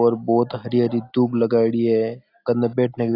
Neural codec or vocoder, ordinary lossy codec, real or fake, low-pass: none; AAC, 32 kbps; real; 5.4 kHz